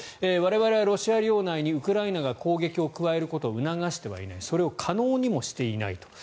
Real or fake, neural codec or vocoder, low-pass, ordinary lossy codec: real; none; none; none